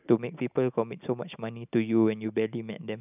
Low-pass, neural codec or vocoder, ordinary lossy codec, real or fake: 3.6 kHz; none; none; real